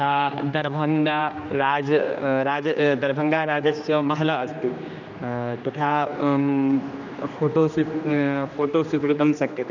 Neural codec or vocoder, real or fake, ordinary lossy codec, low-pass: codec, 16 kHz, 2 kbps, X-Codec, HuBERT features, trained on general audio; fake; none; 7.2 kHz